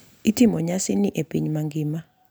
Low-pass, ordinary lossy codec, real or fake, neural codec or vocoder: none; none; real; none